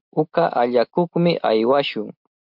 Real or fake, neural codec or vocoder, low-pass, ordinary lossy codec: real; none; 5.4 kHz; MP3, 48 kbps